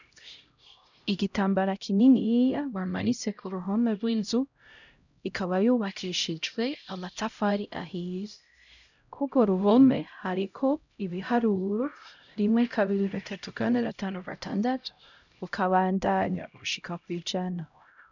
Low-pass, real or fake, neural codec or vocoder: 7.2 kHz; fake; codec, 16 kHz, 0.5 kbps, X-Codec, HuBERT features, trained on LibriSpeech